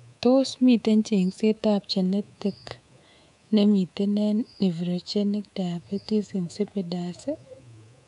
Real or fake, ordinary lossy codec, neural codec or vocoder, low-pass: fake; none; codec, 24 kHz, 3.1 kbps, DualCodec; 10.8 kHz